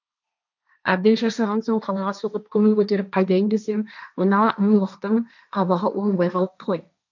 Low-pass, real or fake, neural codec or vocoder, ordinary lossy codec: 7.2 kHz; fake; codec, 16 kHz, 1.1 kbps, Voila-Tokenizer; none